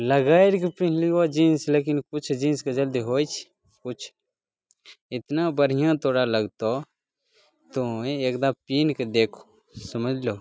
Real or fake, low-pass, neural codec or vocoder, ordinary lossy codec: real; none; none; none